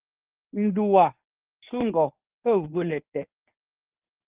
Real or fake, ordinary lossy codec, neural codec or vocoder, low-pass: fake; Opus, 32 kbps; codec, 24 kHz, 0.9 kbps, WavTokenizer, medium speech release version 1; 3.6 kHz